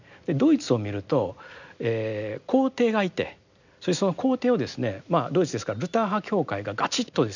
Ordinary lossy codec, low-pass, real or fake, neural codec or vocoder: none; 7.2 kHz; real; none